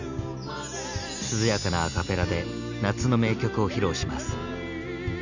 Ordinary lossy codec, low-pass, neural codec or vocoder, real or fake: none; 7.2 kHz; none; real